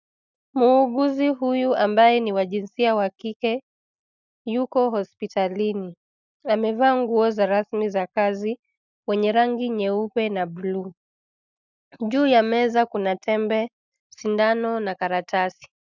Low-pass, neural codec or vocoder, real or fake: 7.2 kHz; none; real